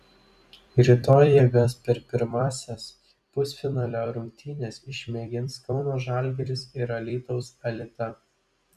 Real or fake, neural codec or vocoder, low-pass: fake; vocoder, 44.1 kHz, 128 mel bands every 256 samples, BigVGAN v2; 14.4 kHz